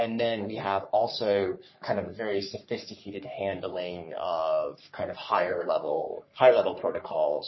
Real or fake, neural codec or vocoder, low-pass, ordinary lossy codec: fake; codec, 44.1 kHz, 3.4 kbps, Pupu-Codec; 7.2 kHz; MP3, 24 kbps